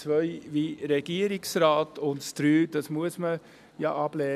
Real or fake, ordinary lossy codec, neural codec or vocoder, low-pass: real; none; none; 14.4 kHz